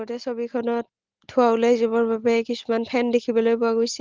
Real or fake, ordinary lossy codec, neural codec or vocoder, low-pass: real; Opus, 16 kbps; none; 7.2 kHz